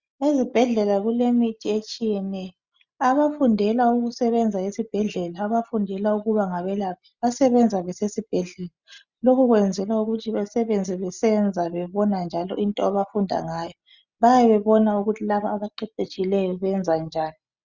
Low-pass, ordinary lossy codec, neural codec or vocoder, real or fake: 7.2 kHz; Opus, 64 kbps; none; real